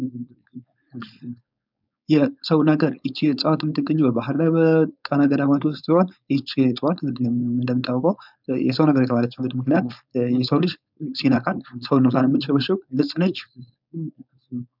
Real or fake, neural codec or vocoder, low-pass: fake; codec, 16 kHz, 4.8 kbps, FACodec; 5.4 kHz